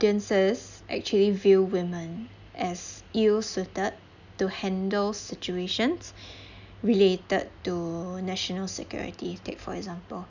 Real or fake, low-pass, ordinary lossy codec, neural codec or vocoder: real; 7.2 kHz; none; none